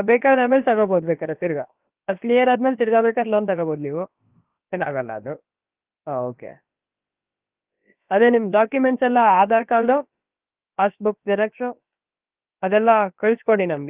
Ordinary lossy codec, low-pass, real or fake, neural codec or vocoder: Opus, 32 kbps; 3.6 kHz; fake; codec, 16 kHz, about 1 kbps, DyCAST, with the encoder's durations